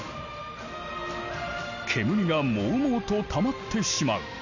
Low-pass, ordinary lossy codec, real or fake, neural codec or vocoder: 7.2 kHz; none; real; none